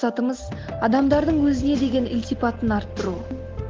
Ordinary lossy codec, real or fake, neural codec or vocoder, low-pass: Opus, 16 kbps; real; none; 7.2 kHz